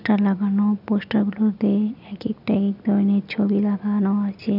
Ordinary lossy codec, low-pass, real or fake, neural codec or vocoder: none; 5.4 kHz; real; none